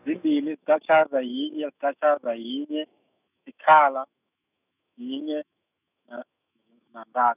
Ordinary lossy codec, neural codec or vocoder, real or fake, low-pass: none; autoencoder, 48 kHz, 128 numbers a frame, DAC-VAE, trained on Japanese speech; fake; 3.6 kHz